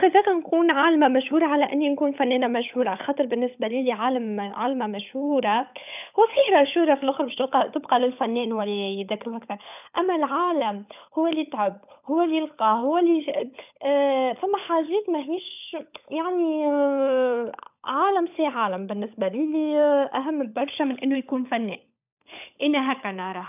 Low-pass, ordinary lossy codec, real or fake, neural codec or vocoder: 3.6 kHz; none; fake; codec, 16 kHz, 16 kbps, FunCodec, trained on LibriTTS, 50 frames a second